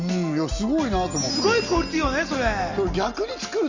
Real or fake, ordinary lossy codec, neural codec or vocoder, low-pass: real; Opus, 64 kbps; none; 7.2 kHz